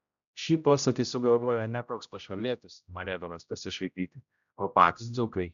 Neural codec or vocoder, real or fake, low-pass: codec, 16 kHz, 0.5 kbps, X-Codec, HuBERT features, trained on general audio; fake; 7.2 kHz